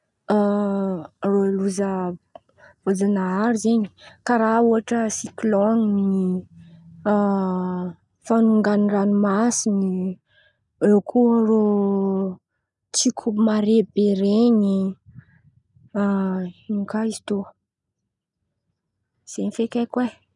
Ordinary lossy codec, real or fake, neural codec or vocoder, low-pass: none; real; none; 10.8 kHz